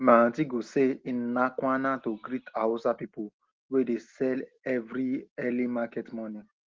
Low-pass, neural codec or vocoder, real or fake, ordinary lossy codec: 7.2 kHz; none; real; Opus, 16 kbps